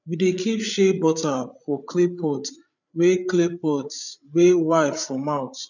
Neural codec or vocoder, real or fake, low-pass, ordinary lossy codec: codec, 16 kHz, 8 kbps, FreqCodec, larger model; fake; 7.2 kHz; none